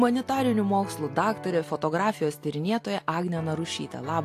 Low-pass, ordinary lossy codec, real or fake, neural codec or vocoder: 14.4 kHz; AAC, 64 kbps; real; none